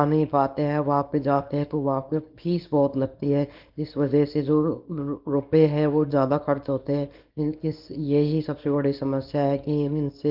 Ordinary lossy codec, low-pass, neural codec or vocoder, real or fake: Opus, 32 kbps; 5.4 kHz; codec, 24 kHz, 0.9 kbps, WavTokenizer, small release; fake